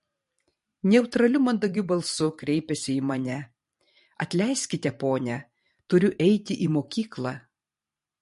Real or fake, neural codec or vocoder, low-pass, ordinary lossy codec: fake; vocoder, 44.1 kHz, 128 mel bands every 512 samples, BigVGAN v2; 14.4 kHz; MP3, 48 kbps